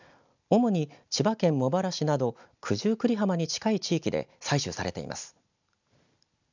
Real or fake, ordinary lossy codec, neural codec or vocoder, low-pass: real; none; none; 7.2 kHz